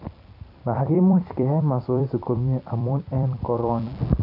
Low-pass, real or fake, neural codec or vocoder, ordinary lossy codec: 5.4 kHz; fake; vocoder, 44.1 kHz, 128 mel bands every 256 samples, BigVGAN v2; AAC, 32 kbps